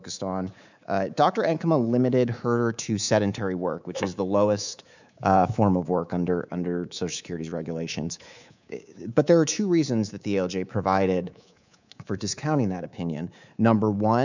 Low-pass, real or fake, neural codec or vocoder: 7.2 kHz; fake; codec, 24 kHz, 3.1 kbps, DualCodec